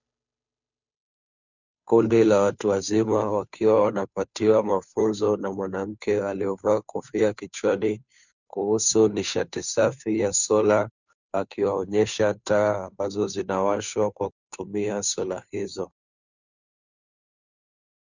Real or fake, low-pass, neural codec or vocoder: fake; 7.2 kHz; codec, 16 kHz, 2 kbps, FunCodec, trained on Chinese and English, 25 frames a second